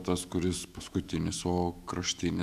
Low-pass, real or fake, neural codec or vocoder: 14.4 kHz; fake; autoencoder, 48 kHz, 128 numbers a frame, DAC-VAE, trained on Japanese speech